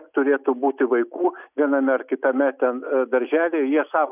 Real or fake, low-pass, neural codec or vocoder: real; 3.6 kHz; none